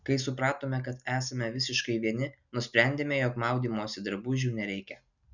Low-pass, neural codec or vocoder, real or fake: 7.2 kHz; none; real